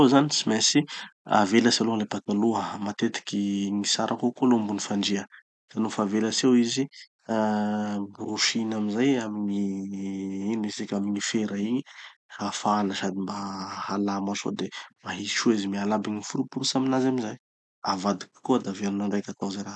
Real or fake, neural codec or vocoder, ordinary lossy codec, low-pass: real; none; none; none